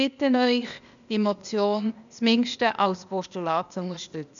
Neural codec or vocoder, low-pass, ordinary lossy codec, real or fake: codec, 16 kHz, 0.8 kbps, ZipCodec; 7.2 kHz; none; fake